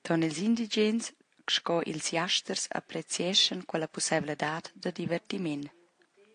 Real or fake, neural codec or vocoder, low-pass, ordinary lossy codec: real; none; 9.9 kHz; AAC, 48 kbps